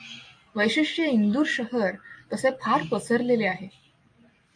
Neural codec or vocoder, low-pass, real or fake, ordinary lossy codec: none; 9.9 kHz; real; AAC, 48 kbps